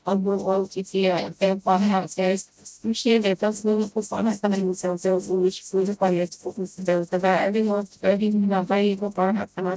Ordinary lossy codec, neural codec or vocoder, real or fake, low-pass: none; codec, 16 kHz, 0.5 kbps, FreqCodec, smaller model; fake; none